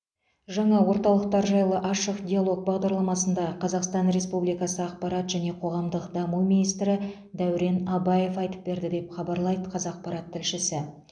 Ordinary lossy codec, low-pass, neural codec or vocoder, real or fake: MP3, 96 kbps; 9.9 kHz; none; real